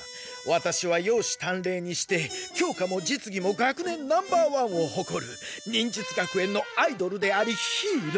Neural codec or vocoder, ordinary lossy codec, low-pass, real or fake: none; none; none; real